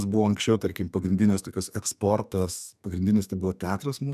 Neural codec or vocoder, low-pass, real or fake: codec, 32 kHz, 1.9 kbps, SNAC; 14.4 kHz; fake